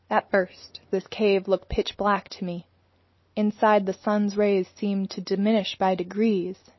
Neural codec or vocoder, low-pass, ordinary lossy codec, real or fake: none; 7.2 kHz; MP3, 24 kbps; real